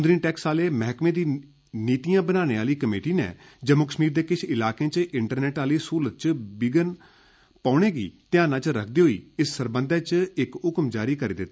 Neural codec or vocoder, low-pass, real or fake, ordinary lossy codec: none; none; real; none